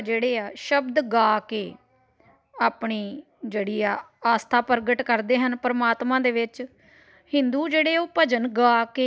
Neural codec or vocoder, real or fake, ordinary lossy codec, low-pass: none; real; none; none